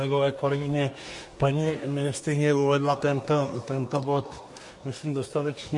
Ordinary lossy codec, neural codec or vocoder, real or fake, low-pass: MP3, 48 kbps; codec, 24 kHz, 1 kbps, SNAC; fake; 10.8 kHz